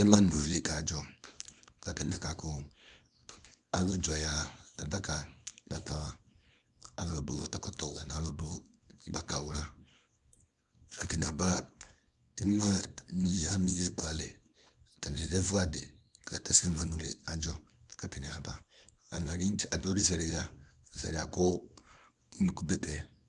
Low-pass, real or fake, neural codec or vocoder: 10.8 kHz; fake; codec, 24 kHz, 0.9 kbps, WavTokenizer, small release